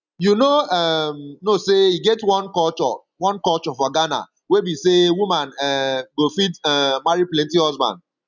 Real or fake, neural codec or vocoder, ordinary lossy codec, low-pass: real; none; none; 7.2 kHz